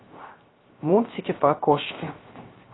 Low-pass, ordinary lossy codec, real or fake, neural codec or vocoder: 7.2 kHz; AAC, 16 kbps; fake; codec, 16 kHz, 0.3 kbps, FocalCodec